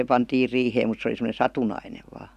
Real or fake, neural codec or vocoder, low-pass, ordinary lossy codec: real; none; 14.4 kHz; none